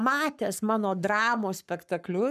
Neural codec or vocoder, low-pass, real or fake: codec, 44.1 kHz, 7.8 kbps, Pupu-Codec; 14.4 kHz; fake